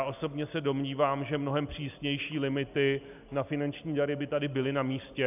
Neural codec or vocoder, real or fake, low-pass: none; real; 3.6 kHz